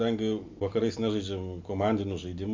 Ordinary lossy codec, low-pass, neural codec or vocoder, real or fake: MP3, 64 kbps; 7.2 kHz; none; real